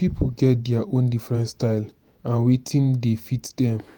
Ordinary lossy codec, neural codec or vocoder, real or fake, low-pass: none; vocoder, 48 kHz, 128 mel bands, Vocos; fake; none